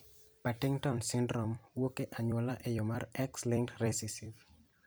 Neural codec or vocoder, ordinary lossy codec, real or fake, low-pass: vocoder, 44.1 kHz, 128 mel bands every 256 samples, BigVGAN v2; none; fake; none